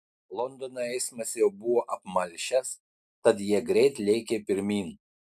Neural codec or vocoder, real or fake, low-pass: none; real; 14.4 kHz